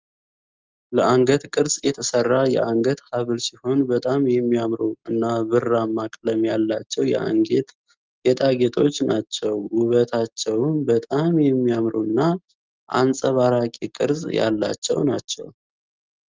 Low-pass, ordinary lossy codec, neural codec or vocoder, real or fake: 7.2 kHz; Opus, 32 kbps; none; real